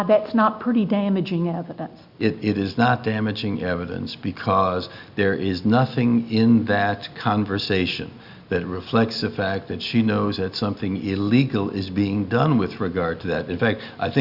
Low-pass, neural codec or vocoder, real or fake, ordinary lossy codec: 5.4 kHz; none; real; Opus, 64 kbps